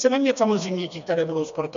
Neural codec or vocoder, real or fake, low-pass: codec, 16 kHz, 2 kbps, FreqCodec, smaller model; fake; 7.2 kHz